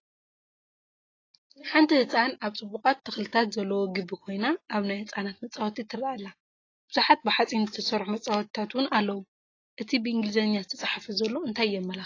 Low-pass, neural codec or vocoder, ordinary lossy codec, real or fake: 7.2 kHz; none; AAC, 32 kbps; real